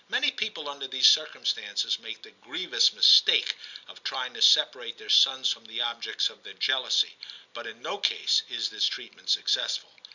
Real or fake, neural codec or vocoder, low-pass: real; none; 7.2 kHz